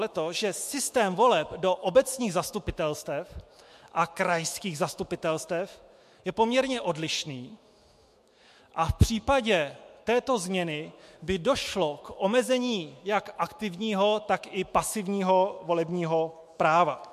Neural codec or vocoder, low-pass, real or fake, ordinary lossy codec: autoencoder, 48 kHz, 128 numbers a frame, DAC-VAE, trained on Japanese speech; 14.4 kHz; fake; MP3, 64 kbps